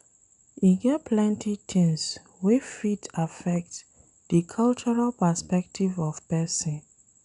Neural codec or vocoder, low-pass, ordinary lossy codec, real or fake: none; 10.8 kHz; none; real